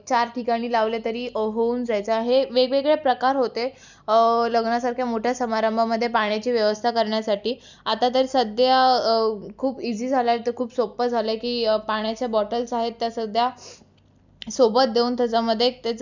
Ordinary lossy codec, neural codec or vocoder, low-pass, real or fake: none; none; 7.2 kHz; real